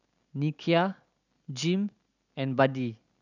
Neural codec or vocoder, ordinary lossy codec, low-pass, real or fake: none; none; 7.2 kHz; real